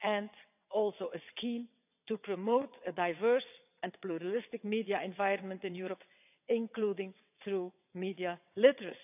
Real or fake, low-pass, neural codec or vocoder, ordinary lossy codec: fake; 3.6 kHz; vocoder, 22.05 kHz, 80 mel bands, WaveNeXt; none